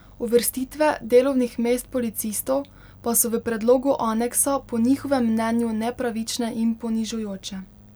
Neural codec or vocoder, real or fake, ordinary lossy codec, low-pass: none; real; none; none